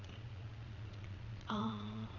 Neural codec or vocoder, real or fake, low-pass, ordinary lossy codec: vocoder, 22.05 kHz, 80 mel bands, Vocos; fake; 7.2 kHz; none